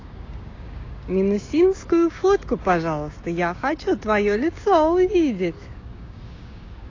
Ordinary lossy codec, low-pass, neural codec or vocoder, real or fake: AAC, 32 kbps; 7.2 kHz; codec, 16 kHz, 6 kbps, DAC; fake